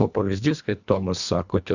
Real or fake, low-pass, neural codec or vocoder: fake; 7.2 kHz; codec, 24 kHz, 1.5 kbps, HILCodec